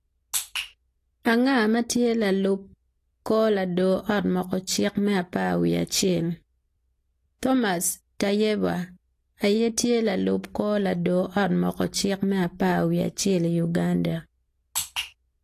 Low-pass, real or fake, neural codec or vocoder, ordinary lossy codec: 14.4 kHz; real; none; AAC, 48 kbps